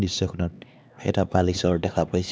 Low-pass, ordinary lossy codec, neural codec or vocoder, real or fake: none; none; codec, 16 kHz, 2 kbps, X-Codec, HuBERT features, trained on LibriSpeech; fake